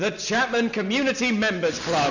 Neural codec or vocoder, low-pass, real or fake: none; 7.2 kHz; real